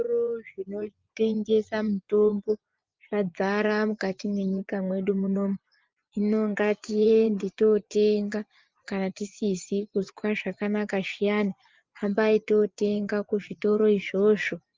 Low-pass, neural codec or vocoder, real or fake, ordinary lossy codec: 7.2 kHz; vocoder, 24 kHz, 100 mel bands, Vocos; fake; Opus, 24 kbps